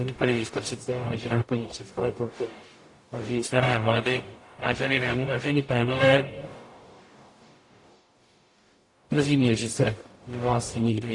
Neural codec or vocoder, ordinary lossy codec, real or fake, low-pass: codec, 44.1 kHz, 0.9 kbps, DAC; AAC, 48 kbps; fake; 10.8 kHz